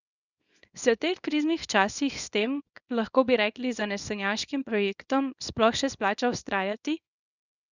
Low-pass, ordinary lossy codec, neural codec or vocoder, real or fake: 7.2 kHz; none; codec, 24 kHz, 0.9 kbps, WavTokenizer, small release; fake